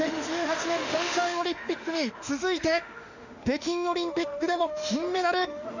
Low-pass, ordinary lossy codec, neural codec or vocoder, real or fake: 7.2 kHz; none; autoencoder, 48 kHz, 32 numbers a frame, DAC-VAE, trained on Japanese speech; fake